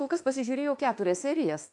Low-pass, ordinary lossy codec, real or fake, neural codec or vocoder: 10.8 kHz; AAC, 64 kbps; fake; autoencoder, 48 kHz, 32 numbers a frame, DAC-VAE, trained on Japanese speech